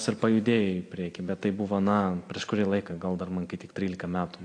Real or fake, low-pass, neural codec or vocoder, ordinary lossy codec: real; 9.9 kHz; none; AAC, 48 kbps